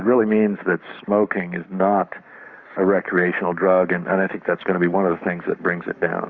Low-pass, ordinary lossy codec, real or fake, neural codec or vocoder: 7.2 kHz; Opus, 64 kbps; fake; codec, 44.1 kHz, 7.8 kbps, Pupu-Codec